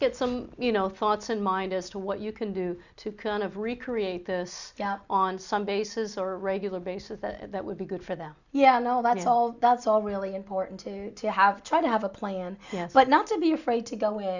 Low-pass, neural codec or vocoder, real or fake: 7.2 kHz; none; real